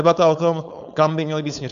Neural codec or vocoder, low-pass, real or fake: codec, 16 kHz, 4.8 kbps, FACodec; 7.2 kHz; fake